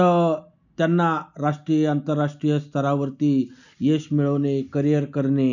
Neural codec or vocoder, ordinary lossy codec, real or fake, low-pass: none; none; real; 7.2 kHz